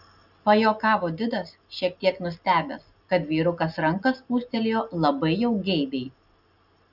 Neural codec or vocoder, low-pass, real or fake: none; 5.4 kHz; real